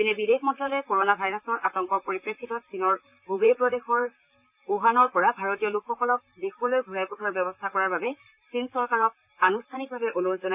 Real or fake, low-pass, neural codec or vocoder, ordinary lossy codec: fake; 3.6 kHz; vocoder, 44.1 kHz, 128 mel bands, Pupu-Vocoder; none